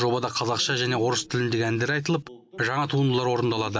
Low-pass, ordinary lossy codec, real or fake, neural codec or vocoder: none; none; real; none